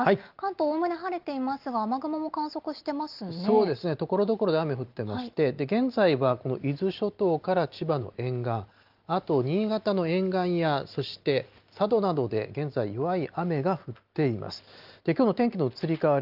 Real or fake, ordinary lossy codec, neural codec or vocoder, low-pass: real; Opus, 24 kbps; none; 5.4 kHz